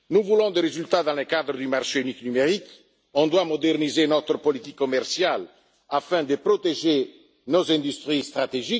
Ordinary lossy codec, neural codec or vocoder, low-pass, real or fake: none; none; none; real